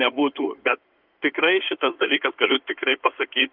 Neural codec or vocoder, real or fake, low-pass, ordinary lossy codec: codec, 16 kHz in and 24 kHz out, 2.2 kbps, FireRedTTS-2 codec; fake; 5.4 kHz; Opus, 32 kbps